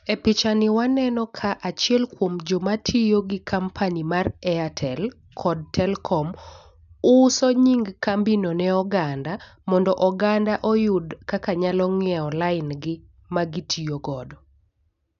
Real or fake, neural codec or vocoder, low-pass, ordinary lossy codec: real; none; 7.2 kHz; none